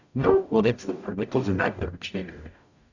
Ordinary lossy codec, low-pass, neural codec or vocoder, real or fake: none; 7.2 kHz; codec, 44.1 kHz, 0.9 kbps, DAC; fake